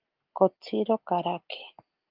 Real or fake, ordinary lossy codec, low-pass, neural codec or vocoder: real; Opus, 32 kbps; 5.4 kHz; none